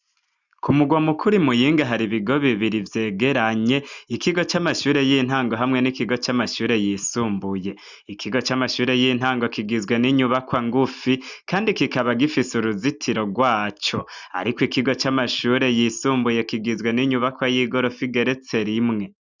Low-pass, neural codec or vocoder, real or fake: 7.2 kHz; none; real